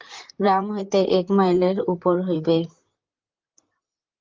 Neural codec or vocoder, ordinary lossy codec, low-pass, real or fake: vocoder, 44.1 kHz, 128 mel bands, Pupu-Vocoder; Opus, 24 kbps; 7.2 kHz; fake